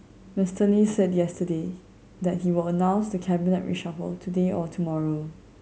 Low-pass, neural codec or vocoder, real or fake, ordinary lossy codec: none; none; real; none